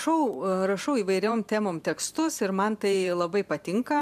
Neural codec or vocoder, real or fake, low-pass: vocoder, 44.1 kHz, 128 mel bands every 512 samples, BigVGAN v2; fake; 14.4 kHz